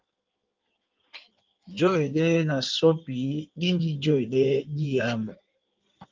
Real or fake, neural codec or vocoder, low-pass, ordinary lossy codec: fake; codec, 16 kHz in and 24 kHz out, 1.1 kbps, FireRedTTS-2 codec; 7.2 kHz; Opus, 24 kbps